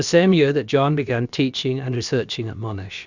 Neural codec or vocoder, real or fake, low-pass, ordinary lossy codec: codec, 16 kHz, about 1 kbps, DyCAST, with the encoder's durations; fake; 7.2 kHz; Opus, 64 kbps